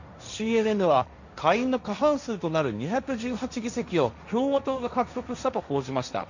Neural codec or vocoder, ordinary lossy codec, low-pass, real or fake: codec, 16 kHz, 1.1 kbps, Voila-Tokenizer; none; 7.2 kHz; fake